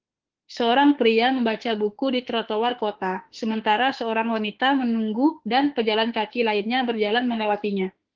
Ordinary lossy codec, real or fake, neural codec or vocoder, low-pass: Opus, 16 kbps; fake; autoencoder, 48 kHz, 32 numbers a frame, DAC-VAE, trained on Japanese speech; 7.2 kHz